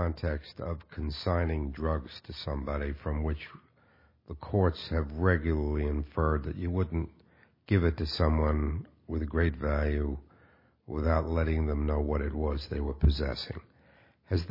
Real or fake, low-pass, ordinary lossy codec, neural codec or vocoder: real; 5.4 kHz; MP3, 24 kbps; none